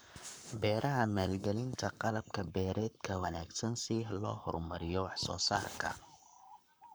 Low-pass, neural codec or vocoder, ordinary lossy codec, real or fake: none; codec, 44.1 kHz, 7.8 kbps, Pupu-Codec; none; fake